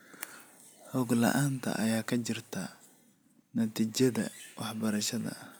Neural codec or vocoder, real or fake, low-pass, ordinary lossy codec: none; real; none; none